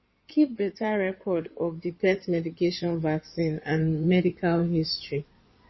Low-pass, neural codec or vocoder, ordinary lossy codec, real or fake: 7.2 kHz; codec, 16 kHz in and 24 kHz out, 2.2 kbps, FireRedTTS-2 codec; MP3, 24 kbps; fake